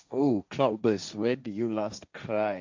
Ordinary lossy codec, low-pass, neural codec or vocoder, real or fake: MP3, 64 kbps; 7.2 kHz; codec, 16 kHz, 1.1 kbps, Voila-Tokenizer; fake